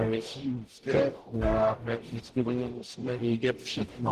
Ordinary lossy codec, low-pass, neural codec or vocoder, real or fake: Opus, 16 kbps; 14.4 kHz; codec, 44.1 kHz, 0.9 kbps, DAC; fake